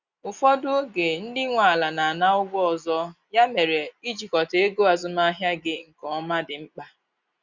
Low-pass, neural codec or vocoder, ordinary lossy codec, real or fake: 7.2 kHz; none; Opus, 64 kbps; real